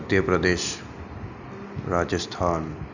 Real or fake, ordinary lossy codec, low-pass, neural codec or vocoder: real; none; 7.2 kHz; none